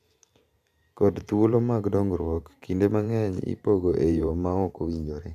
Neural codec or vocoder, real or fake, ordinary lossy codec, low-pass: vocoder, 44.1 kHz, 128 mel bands every 512 samples, BigVGAN v2; fake; AAC, 96 kbps; 14.4 kHz